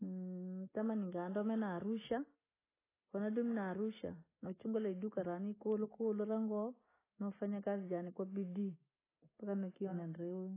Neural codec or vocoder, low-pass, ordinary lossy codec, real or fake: none; 3.6 kHz; AAC, 16 kbps; real